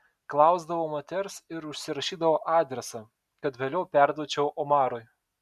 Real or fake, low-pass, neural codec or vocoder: real; 14.4 kHz; none